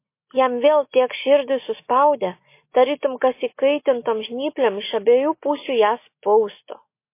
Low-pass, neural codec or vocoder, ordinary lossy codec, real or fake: 3.6 kHz; none; MP3, 24 kbps; real